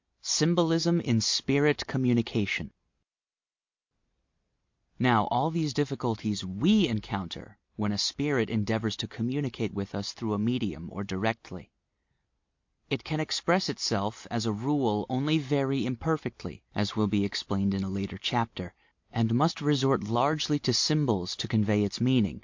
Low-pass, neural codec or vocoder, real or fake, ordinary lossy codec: 7.2 kHz; none; real; MP3, 48 kbps